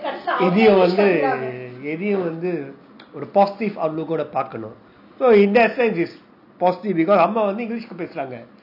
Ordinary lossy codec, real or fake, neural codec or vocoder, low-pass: none; real; none; 5.4 kHz